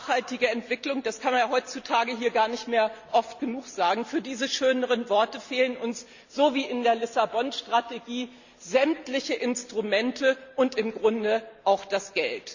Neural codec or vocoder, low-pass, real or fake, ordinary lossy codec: none; 7.2 kHz; real; Opus, 64 kbps